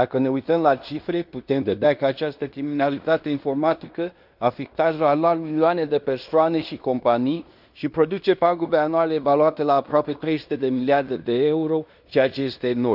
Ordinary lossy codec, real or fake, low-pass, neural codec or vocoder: none; fake; 5.4 kHz; codec, 16 kHz in and 24 kHz out, 0.9 kbps, LongCat-Audio-Codec, fine tuned four codebook decoder